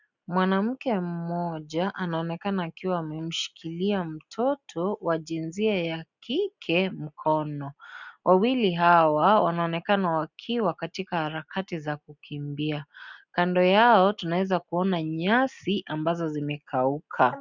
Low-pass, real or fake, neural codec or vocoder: 7.2 kHz; real; none